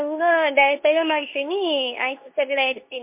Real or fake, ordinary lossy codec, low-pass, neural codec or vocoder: fake; MP3, 24 kbps; 3.6 kHz; codec, 24 kHz, 0.9 kbps, WavTokenizer, medium speech release version 2